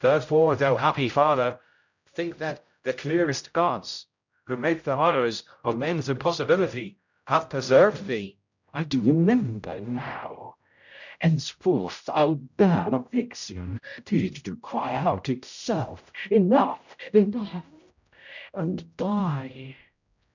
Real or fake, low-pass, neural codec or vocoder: fake; 7.2 kHz; codec, 16 kHz, 0.5 kbps, X-Codec, HuBERT features, trained on general audio